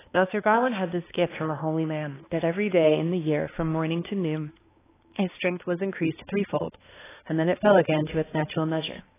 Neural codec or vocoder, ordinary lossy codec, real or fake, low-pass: codec, 16 kHz, 2 kbps, X-Codec, HuBERT features, trained on balanced general audio; AAC, 16 kbps; fake; 3.6 kHz